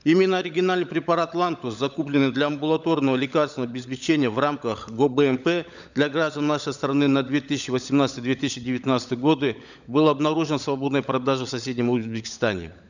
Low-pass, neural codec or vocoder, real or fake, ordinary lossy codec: 7.2 kHz; codec, 16 kHz, 16 kbps, FunCodec, trained on LibriTTS, 50 frames a second; fake; none